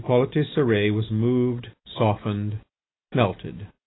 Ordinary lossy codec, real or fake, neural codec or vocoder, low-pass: AAC, 16 kbps; real; none; 7.2 kHz